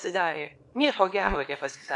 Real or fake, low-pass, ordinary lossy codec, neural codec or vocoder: fake; 10.8 kHz; AAC, 64 kbps; codec, 24 kHz, 0.9 kbps, WavTokenizer, small release